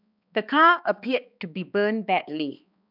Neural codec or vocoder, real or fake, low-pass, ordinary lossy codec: codec, 16 kHz, 2 kbps, X-Codec, HuBERT features, trained on balanced general audio; fake; 5.4 kHz; none